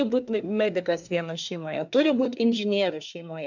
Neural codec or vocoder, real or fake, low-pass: codec, 24 kHz, 1 kbps, SNAC; fake; 7.2 kHz